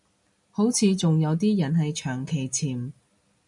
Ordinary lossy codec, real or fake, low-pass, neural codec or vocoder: MP3, 96 kbps; real; 10.8 kHz; none